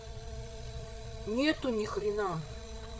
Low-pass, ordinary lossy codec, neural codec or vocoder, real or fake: none; none; codec, 16 kHz, 8 kbps, FreqCodec, larger model; fake